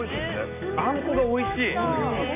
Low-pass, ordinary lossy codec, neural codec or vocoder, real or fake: 3.6 kHz; none; none; real